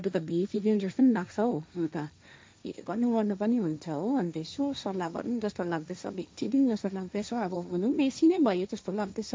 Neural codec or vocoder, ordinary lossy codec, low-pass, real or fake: codec, 16 kHz, 1.1 kbps, Voila-Tokenizer; none; none; fake